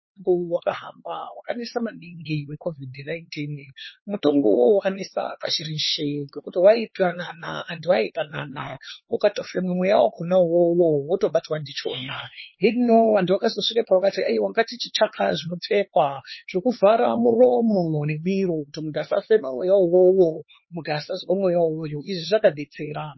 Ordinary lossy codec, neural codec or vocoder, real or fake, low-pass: MP3, 24 kbps; codec, 16 kHz, 4 kbps, X-Codec, HuBERT features, trained on LibriSpeech; fake; 7.2 kHz